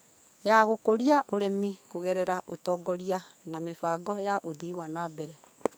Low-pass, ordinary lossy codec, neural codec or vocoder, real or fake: none; none; codec, 44.1 kHz, 2.6 kbps, SNAC; fake